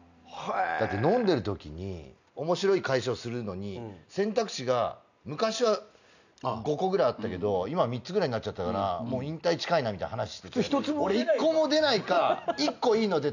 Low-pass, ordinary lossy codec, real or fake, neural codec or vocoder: 7.2 kHz; none; real; none